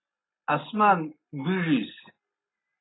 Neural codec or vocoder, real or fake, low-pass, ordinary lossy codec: none; real; 7.2 kHz; AAC, 16 kbps